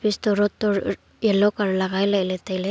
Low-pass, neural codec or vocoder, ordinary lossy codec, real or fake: none; none; none; real